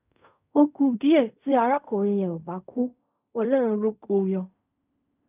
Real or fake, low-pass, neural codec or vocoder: fake; 3.6 kHz; codec, 16 kHz in and 24 kHz out, 0.4 kbps, LongCat-Audio-Codec, fine tuned four codebook decoder